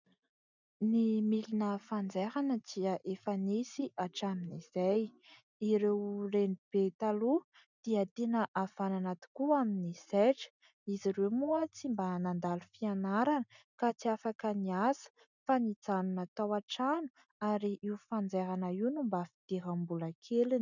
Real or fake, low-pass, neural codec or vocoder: real; 7.2 kHz; none